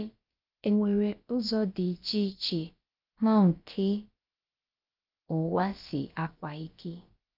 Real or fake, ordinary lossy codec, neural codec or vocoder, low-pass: fake; Opus, 32 kbps; codec, 16 kHz, about 1 kbps, DyCAST, with the encoder's durations; 5.4 kHz